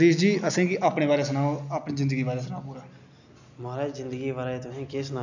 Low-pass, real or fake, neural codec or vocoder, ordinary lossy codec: 7.2 kHz; real; none; none